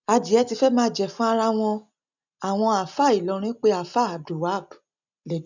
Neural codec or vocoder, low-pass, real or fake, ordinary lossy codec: none; 7.2 kHz; real; none